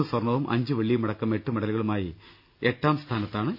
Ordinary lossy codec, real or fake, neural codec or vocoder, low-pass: none; real; none; 5.4 kHz